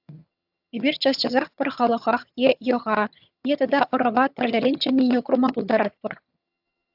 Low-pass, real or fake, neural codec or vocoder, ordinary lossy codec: 5.4 kHz; fake; vocoder, 22.05 kHz, 80 mel bands, HiFi-GAN; AAC, 48 kbps